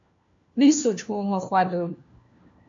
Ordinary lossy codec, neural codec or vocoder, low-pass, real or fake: AAC, 64 kbps; codec, 16 kHz, 1 kbps, FunCodec, trained on LibriTTS, 50 frames a second; 7.2 kHz; fake